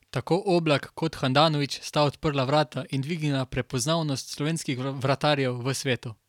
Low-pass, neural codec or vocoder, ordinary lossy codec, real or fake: 19.8 kHz; none; none; real